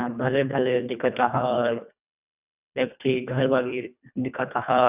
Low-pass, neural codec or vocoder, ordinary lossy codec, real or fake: 3.6 kHz; codec, 24 kHz, 1.5 kbps, HILCodec; none; fake